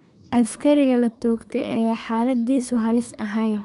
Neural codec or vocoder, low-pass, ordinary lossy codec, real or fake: codec, 24 kHz, 1 kbps, SNAC; 10.8 kHz; none; fake